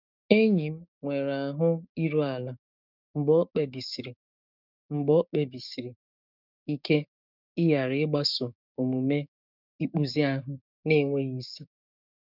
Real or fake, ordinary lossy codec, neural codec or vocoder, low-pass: fake; none; codec, 16 kHz, 6 kbps, DAC; 5.4 kHz